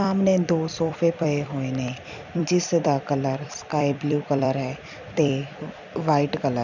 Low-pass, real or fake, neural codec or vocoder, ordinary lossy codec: 7.2 kHz; fake; vocoder, 44.1 kHz, 128 mel bands every 256 samples, BigVGAN v2; none